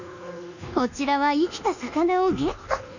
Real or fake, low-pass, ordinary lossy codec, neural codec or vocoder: fake; 7.2 kHz; none; codec, 24 kHz, 1.2 kbps, DualCodec